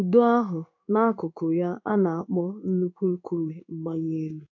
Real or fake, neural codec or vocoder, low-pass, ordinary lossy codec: fake; codec, 16 kHz in and 24 kHz out, 1 kbps, XY-Tokenizer; 7.2 kHz; none